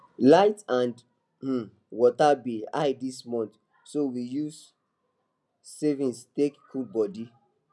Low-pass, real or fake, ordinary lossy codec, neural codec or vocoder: none; real; none; none